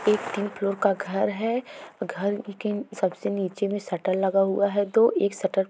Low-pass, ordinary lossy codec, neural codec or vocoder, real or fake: none; none; none; real